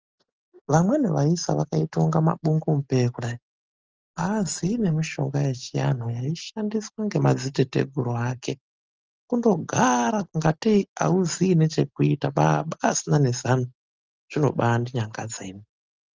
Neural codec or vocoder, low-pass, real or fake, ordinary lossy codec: none; 7.2 kHz; real; Opus, 24 kbps